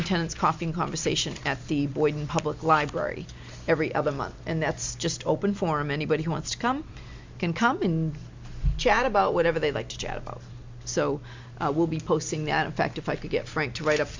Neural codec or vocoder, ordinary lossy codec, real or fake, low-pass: none; MP3, 64 kbps; real; 7.2 kHz